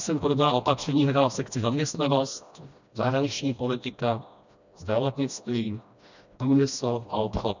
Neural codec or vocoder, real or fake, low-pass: codec, 16 kHz, 1 kbps, FreqCodec, smaller model; fake; 7.2 kHz